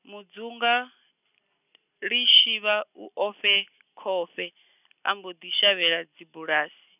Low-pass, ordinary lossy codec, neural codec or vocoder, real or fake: 3.6 kHz; none; none; real